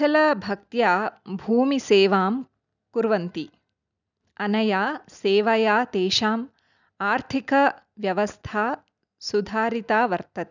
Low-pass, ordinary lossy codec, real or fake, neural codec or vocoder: 7.2 kHz; none; real; none